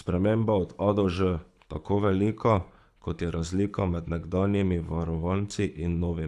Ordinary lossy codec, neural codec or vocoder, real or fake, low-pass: none; codec, 24 kHz, 6 kbps, HILCodec; fake; none